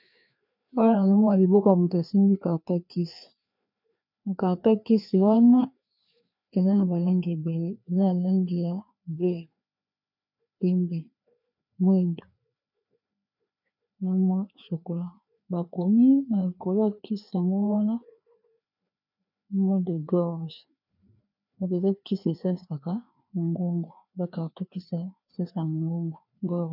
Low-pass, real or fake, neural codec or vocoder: 5.4 kHz; fake; codec, 16 kHz, 2 kbps, FreqCodec, larger model